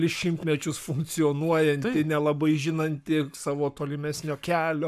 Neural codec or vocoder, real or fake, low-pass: codec, 44.1 kHz, 7.8 kbps, Pupu-Codec; fake; 14.4 kHz